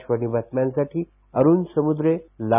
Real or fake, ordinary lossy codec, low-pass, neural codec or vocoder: real; none; 3.6 kHz; none